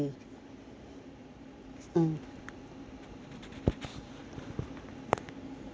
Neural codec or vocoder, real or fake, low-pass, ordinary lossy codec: none; real; none; none